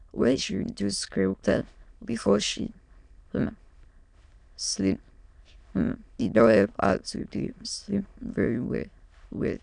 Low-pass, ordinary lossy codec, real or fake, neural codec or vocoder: 9.9 kHz; none; fake; autoencoder, 22.05 kHz, a latent of 192 numbers a frame, VITS, trained on many speakers